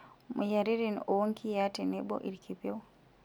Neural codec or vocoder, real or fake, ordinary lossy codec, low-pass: none; real; none; none